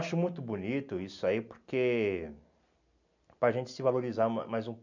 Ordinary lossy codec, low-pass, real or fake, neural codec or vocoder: none; 7.2 kHz; real; none